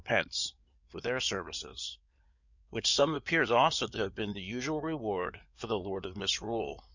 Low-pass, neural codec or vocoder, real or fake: 7.2 kHz; codec, 16 kHz in and 24 kHz out, 2.2 kbps, FireRedTTS-2 codec; fake